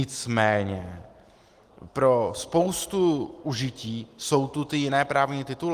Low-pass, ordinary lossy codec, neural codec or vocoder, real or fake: 14.4 kHz; Opus, 24 kbps; none; real